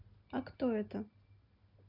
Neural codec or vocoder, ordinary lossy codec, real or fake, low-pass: none; none; real; 5.4 kHz